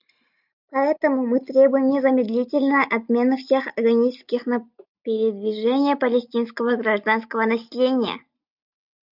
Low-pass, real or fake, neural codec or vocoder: 5.4 kHz; real; none